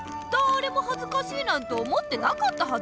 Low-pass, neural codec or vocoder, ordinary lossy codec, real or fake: none; none; none; real